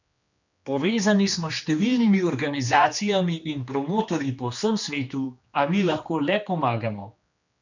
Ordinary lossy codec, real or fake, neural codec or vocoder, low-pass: none; fake; codec, 16 kHz, 2 kbps, X-Codec, HuBERT features, trained on general audio; 7.2 kHz